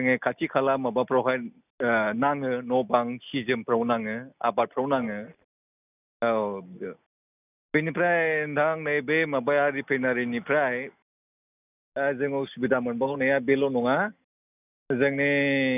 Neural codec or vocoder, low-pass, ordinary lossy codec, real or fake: none; 3.6 kHz; none; real